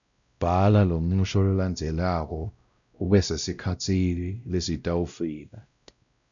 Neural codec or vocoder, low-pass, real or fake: codec, 16 kHz, 0.5 kbps, X-Codec, WavLM features, trained on Multilingual LibriSpeech; 7.2 kHz; fake